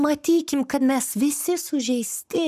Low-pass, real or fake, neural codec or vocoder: 14.4 kHz; fake; codec, 44.1 kHz, 7.8 kbps, Pupu-Codec